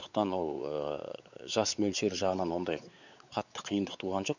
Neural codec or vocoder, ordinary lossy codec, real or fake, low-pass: codec, 16 kHz, 4 kbps, X-Codec, WavLM features, trained on Multilingual LibriSpeech; none; fake; 7.2 kHz